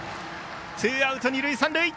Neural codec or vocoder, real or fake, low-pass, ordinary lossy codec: none; real; none; none